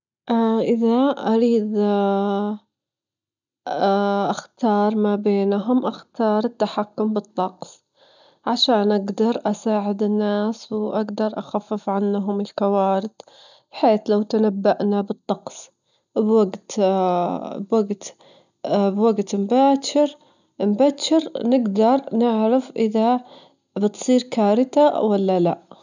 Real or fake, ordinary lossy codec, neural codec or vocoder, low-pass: real; none; none; 7.2 kHz